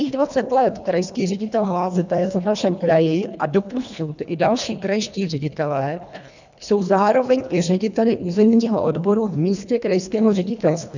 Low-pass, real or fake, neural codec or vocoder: 7.2 kHz; fake; codec, 24 kHz, 1.5 kbps, HILCodec